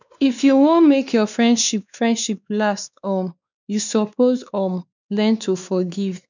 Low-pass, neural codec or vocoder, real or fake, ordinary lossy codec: 7.2 kHz; codec, 16 kHz, 2 kbps, X-Codec, WavLM features, trained on Multilingual LibriSpeech; fake; none